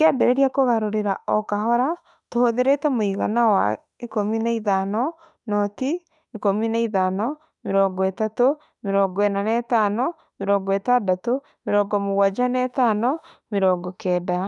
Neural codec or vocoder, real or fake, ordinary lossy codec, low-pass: autoencoder, 48 kHz, 32 numbers a frame, DAC-VAE, trained on Japanese speech; fake; none; 10.8 kHz